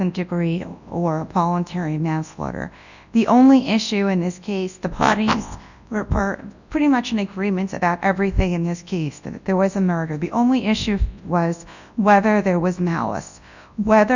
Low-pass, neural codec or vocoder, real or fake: 7.2 kHz; codec, 24 kHz, 0.9 kbps, WavTokenizer, large speech release; fake